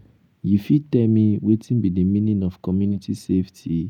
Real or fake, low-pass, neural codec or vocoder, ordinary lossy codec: real; 19.8 kHz; none; none